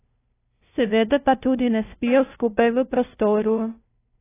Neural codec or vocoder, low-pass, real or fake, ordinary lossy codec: codec, 16 kHz, 0.5 kbps, FunCodec, trained on LibriTTS, 25 frames a second; 3.6 kHz; fake; AAC, 16 kbps